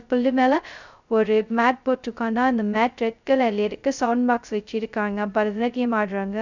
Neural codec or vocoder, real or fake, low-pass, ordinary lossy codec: codec, 16 kHz, 0.2 kbps, FocalCodec; fake; 7.2 kHz; none